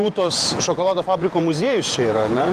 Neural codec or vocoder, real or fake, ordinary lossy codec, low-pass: vocoder, 48 kHz, 128 mel bands, Vocos; fake; Opus, 24 kbps; 14.4 kHz